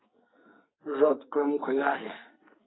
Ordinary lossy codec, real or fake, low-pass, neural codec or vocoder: AAC, 16 kbps; fake; 7.2 kHz; codec, 44.1 kHz, 2.6 kbps, SNAC